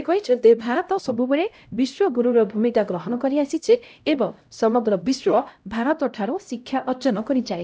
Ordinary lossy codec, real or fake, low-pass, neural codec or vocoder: none; fake; none; codec, 16 kHz, 0.5 kbps, X-Codec, HuBERT features, trained on LibriSpeech